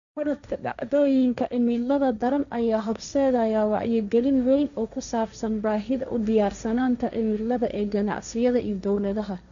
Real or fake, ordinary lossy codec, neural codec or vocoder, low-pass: fake; none; codec, 16 kHz, 1.1 kbps, Voila-Tokenizer; 7.2 kHz